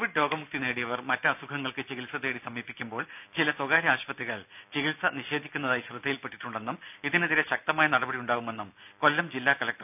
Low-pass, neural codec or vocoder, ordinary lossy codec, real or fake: 3.6 kHz; none; none; real